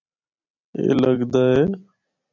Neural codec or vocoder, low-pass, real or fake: vocoder, 44.1 kHz, 128 mel bands every 256 samples, BigVGAN v2; 7.2 kHz; fake